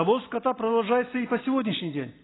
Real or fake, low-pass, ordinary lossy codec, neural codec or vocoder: real; 7.2 kHz; AAC, 16 kbps; none